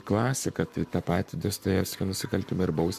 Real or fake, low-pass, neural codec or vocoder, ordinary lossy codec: fake; 14.4 kHz; codec, 44.1 kHz, 7.8 kbps, Pupu-Codec; MP3, 96 kbps